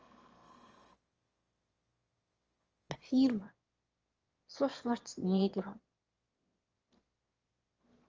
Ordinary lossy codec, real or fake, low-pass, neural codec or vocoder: Opus, 24 kbps; fake; 7.2 kHz; autoencoder, 22.05 kHz, a latent of 192 numbers a frame, VITS, trained on one speaker